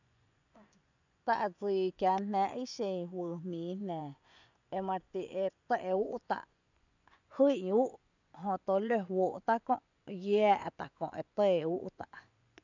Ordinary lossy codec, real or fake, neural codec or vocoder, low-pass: none; fake; vocoder, 24 kHz, 100 mel bands, Vocos; 7.2 kHz